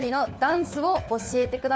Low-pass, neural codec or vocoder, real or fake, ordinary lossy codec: none; codec, 16 kHz, 4 kbps, FunCodec, trained on LibriTTS, 50 frames a second; fake; none